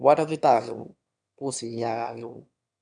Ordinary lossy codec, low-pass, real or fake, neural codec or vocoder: none; 9.9 kHz; fake; autoencoder, 22.05 kHz, a latent of 192 numbers a frame, VITS, trained on one speaker